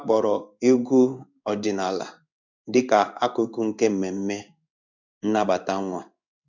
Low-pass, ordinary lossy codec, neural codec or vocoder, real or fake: 7.2 kHz; none; codec, 16 kHz in and 24 kHz out, 1 kbps, XY-Tokenizer; fake